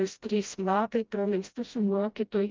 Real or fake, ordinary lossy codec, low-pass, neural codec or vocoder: fake; Opus, 24 kbps; 7.2 kHz; codec, 16 kHz, 0.5 kbps, FreqCodec, smaller model